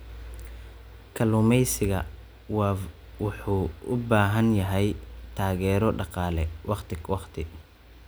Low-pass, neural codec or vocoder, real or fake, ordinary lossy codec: none; none; real; none